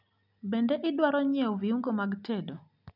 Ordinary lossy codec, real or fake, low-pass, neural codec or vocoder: none; real; 5.4 kHz; none